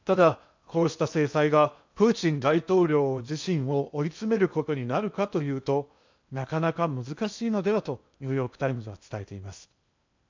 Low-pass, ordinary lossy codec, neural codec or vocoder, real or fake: 7.2 kHz; MP3, 64 kbps; codec, 16 kHz in and 24 kHz out, 0.8 kbps, FocalCodec, streaming, 65536 codes; fake